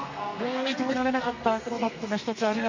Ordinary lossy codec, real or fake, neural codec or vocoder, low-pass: MP3, 48 kbps; fake; codec, 32 kHz, 1.9 kbps, SNAC; 7.2 kHz